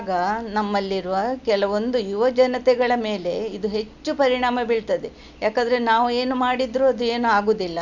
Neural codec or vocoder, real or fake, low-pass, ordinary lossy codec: none; real; 7.2 kHz; none